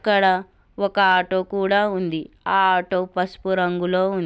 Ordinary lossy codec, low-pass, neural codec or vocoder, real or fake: none; none; none; real